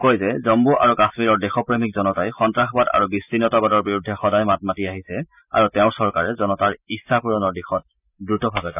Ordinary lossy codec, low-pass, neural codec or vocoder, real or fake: none; 3.6 kHz; none; real